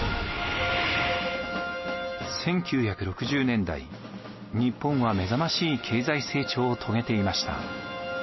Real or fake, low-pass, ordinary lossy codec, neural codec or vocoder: real; 7.2 kHz; MP3, 24 kbps; none